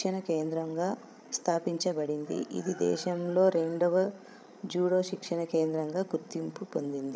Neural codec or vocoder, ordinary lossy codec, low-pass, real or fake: codec, 16 kHz, 16 kbps, FreqCodec, larger model; none; none; fake